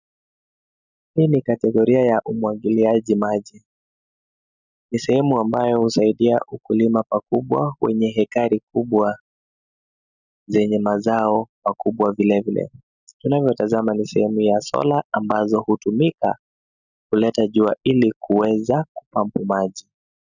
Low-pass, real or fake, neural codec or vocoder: 7.2 kHz; real; none